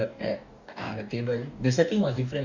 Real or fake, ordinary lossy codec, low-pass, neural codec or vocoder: fake; none; 7.2 kHz; codec, 44.1 kHz, 2.6 kbps, DAC